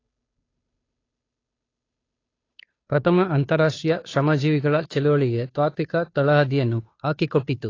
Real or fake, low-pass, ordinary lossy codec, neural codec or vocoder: fake; 7.2 kHz; AAC, 32 kbps; codec, 16 kHz, 2 kbps, FunCodec, trained on Chinese and English, 25 frames a second